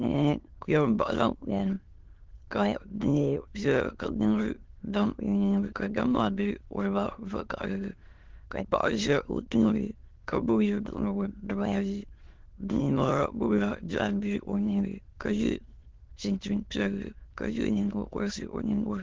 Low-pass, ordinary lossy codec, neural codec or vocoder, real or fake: 7.2 kHz; Opus, 16 kbps; autoencoder, 22.05 kHz, a latent of 192 numbers a frame, VITS, trained on many speakers; fake